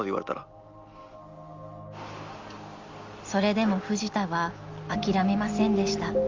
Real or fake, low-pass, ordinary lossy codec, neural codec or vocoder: real; 7.2 kHz; Opus, 32 kbps; none